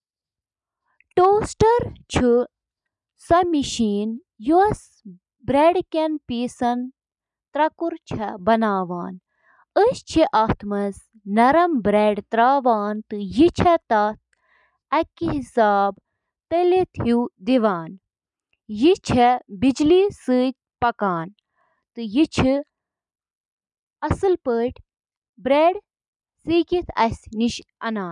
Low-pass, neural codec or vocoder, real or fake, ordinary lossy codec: 10.8 kHz; none; real; none